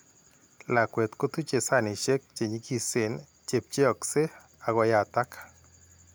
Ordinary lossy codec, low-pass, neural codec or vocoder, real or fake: none; none; none; real